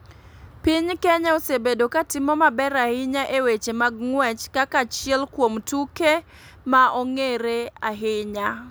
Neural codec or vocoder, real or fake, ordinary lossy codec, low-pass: none; real; none; none